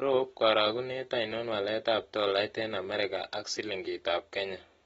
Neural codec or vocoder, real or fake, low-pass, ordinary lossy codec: none; real; 19.8 kHz; AAC, 24 kbps